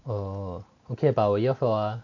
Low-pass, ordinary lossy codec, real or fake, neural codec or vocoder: 7.2 kHz; none; real; none